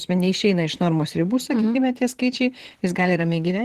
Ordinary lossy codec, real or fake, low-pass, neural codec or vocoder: Opus, 16 kbps; fake; 14.4 kHz; vocoder, 44.1 kHz, 128 mel bands, Pupu-Vocoder